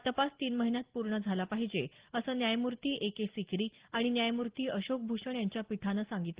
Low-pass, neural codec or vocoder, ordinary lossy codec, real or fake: 3.6 kHz; none; Opus, 16 kbps; real